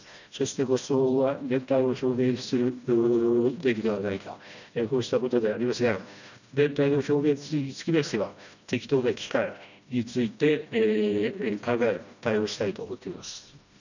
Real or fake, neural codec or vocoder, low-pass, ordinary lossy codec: fake; codec, 16 kHz, 1 kbps, FreqCodec, smaller model; 7.2 kHz; none